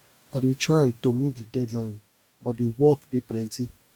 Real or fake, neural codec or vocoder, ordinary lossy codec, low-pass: fake; codec, 44.1 kHz, 2.6 kbps, DAC; none; 19.8 kHz